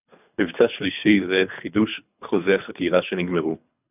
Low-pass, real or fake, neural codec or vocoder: 3.6 kHz; fake; codec, 24 kHz, 3 kbps, HILCodec